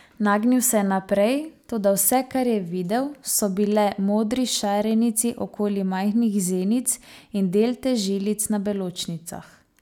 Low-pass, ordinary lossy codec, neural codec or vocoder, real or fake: none; none; none; real